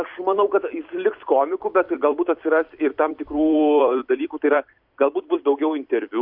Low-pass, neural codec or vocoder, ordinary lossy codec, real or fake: 5.4 kHz; vocoder, 44.1 kHz, 128 mel bands every 256 samples, BigVGAN v2; MP3, 48 kbps; fake